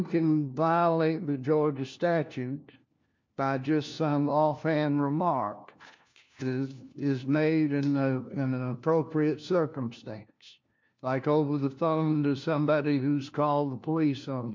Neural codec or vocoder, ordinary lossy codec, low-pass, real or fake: codec, 16 kHz, 1 kbps, FunCodec, trained on LibriTTS, 50 frames a second; MP3, 48 kbps; 7.2 kHz; fake